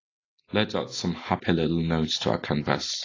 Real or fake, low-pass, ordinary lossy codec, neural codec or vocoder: real; 7.2 kHz; AAC, 32 kbps; none